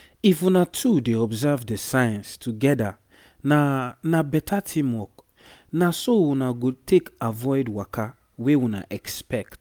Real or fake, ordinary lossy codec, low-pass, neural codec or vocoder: real; none; none; none